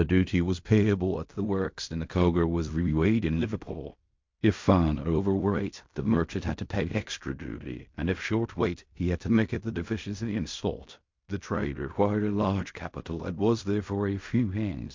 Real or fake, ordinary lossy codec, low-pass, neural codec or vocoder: fake; MP3, 48 kbps; 7.2 kHz; codec, 16 kHz in and 24 kHz out, 0.4 kbps, LongCat-Audio-Codec, fine tuned four codebook decoder